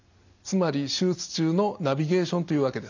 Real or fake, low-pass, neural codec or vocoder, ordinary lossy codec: real; 7.2 kHz; none; none